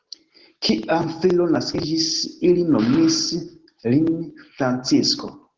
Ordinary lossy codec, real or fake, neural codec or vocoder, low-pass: Opus, 16 kbps; real; none; 7.2 kHz